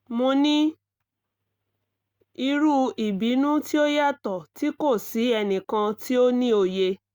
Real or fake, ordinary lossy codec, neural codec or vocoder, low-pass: real; Opus, 64 kbps; none; 19.8 kHz